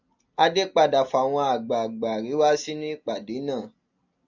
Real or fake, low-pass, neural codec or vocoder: real; 7.2 kHz; none